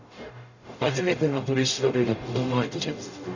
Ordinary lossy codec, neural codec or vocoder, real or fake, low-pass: none; codec, 44.1 kHz, 0.9 kbps, DAC; fake; 7.2 kHz